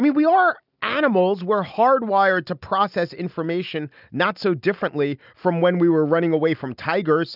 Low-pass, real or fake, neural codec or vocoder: 5.4 kHz; real; none